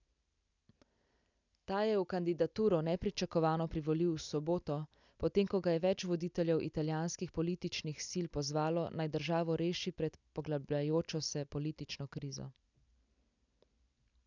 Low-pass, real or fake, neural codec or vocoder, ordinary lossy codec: 7.2 kHz; real; none; none